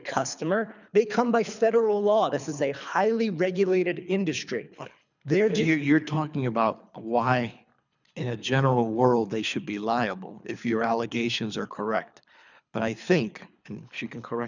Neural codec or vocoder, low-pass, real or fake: codec, 24 kHz, 3 kbps, HILCodec; 7.2 kHz; fake